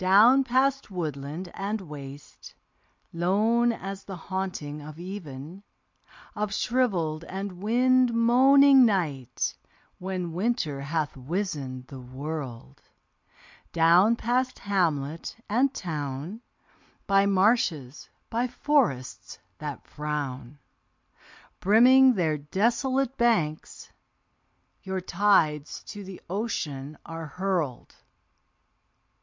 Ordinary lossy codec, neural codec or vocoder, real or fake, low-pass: MP3, 64 kbps; none; real; 7.2 kHz